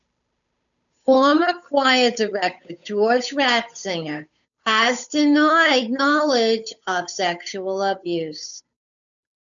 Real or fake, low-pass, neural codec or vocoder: fake; 7.2 kHz; codec, 16 kHz, 8 kbps, FunCodec, trained on Chinese and English, 25 frames a second